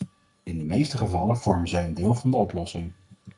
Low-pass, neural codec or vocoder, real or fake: 10.8 kHz; codec, 44.1 kHz, 2.6 kbps, SNAC; fake